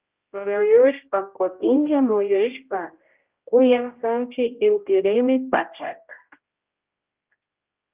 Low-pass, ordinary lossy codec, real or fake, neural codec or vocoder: 3.6 kHz; Opus, 24 kbps; fake; codec, 16 kHz, 0.5 kbps, X-Codec, HuBERT features, trained on general audio